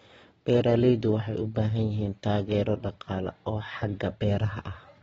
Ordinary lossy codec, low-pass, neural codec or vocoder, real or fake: AAC, 24 kbps; 19.8 kHz; vocoder, 44.1 kHz, 128 mel bands every 512 samples, BigVGAN v2; fake